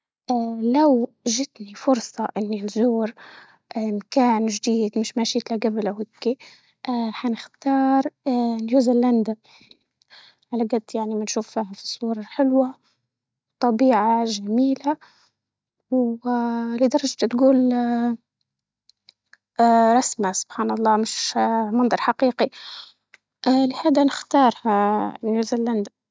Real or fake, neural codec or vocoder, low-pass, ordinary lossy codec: real; none; none; none